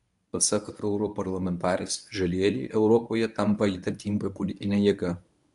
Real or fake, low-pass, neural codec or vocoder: fake; 10.8 kHz; codec, 24 kHz, 0.9 kbps, WavTokenizer, medium speech release version 1